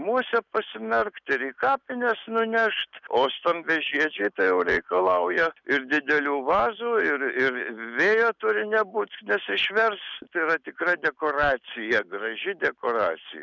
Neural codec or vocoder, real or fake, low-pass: none; real; 7.2 kHz